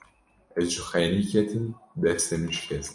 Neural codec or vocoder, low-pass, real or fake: none; 10.8 kHz; real